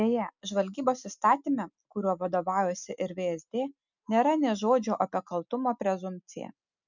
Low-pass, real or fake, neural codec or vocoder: 7.2 kHz; real; none